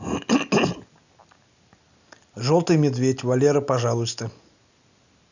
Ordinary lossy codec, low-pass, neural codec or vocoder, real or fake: none; 7.2 kHz; none; real